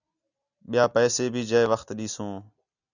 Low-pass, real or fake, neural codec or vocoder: 7.2 kHz; real; none